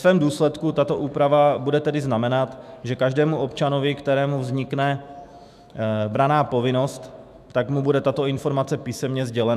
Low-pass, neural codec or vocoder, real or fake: 14.4 kHz; autoencoder, 48 kHz, 128 numbers a frame, DAC-VAE, trained on Japanese speech; fake